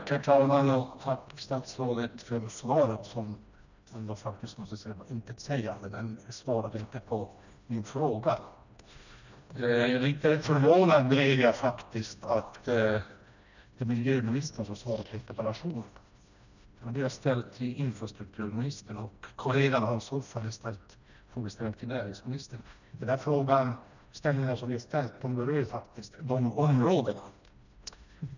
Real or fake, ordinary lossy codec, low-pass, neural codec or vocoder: fake; none; 7.2 kHz; codec, 16 kHz, 1 kbps, FreqCodec, smaller model